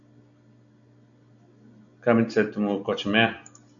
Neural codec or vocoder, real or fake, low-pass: none; real; 7.2 kHz